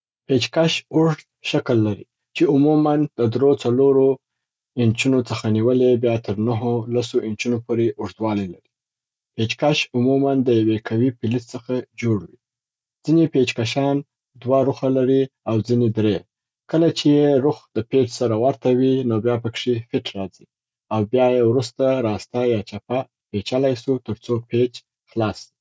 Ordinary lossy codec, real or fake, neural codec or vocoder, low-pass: none; real; none; none